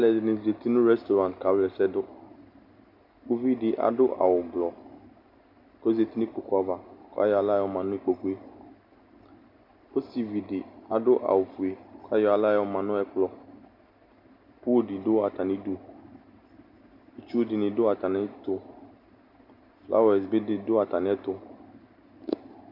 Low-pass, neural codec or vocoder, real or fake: 5.4 kHz; none; real